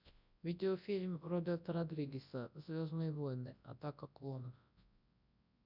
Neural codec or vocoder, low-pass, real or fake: codec, 24 kHz, 0.9 kbps, WavTokenizer, large speech release; 5.4 kHz; fake